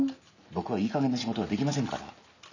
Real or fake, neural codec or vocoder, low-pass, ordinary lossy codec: real; none; 7.2 kHz; none